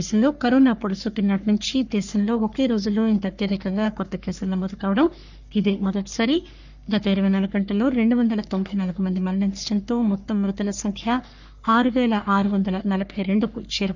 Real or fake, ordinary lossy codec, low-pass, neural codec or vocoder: fake; none; 7.2 kHz; codec, 44.1 kHz, 3.4 kbps, Pupu-Codec